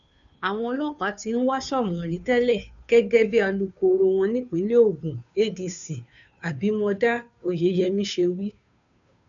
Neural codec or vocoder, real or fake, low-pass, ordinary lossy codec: codec, 16 kHz, 2 kbps, FunCodec, trained on Chinese and English, 25 frames a second; fake; 7.2 kHz; none